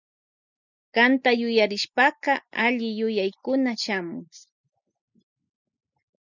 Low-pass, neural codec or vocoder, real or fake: 7.2 kHz; none; real